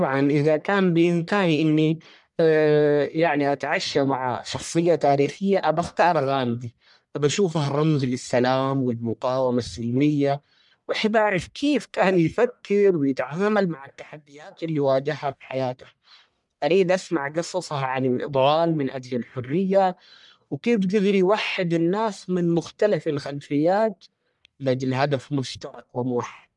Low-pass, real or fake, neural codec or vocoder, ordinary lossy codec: 10.8 kHz; fake; codec, 44.1 kHz, 1.7 kbps, Pupu-Codec; MP3, 96 kbps